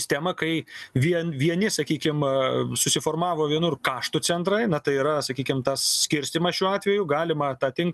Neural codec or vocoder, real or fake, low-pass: none; real; 14.4 kHz